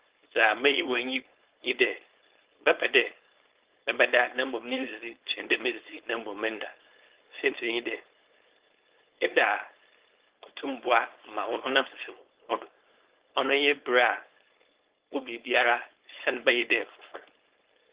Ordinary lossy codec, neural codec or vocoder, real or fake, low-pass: Opus, 16 kbps; codec, 16 kHz, 4.8 kbps, FACodec; fake; 3.6 kHz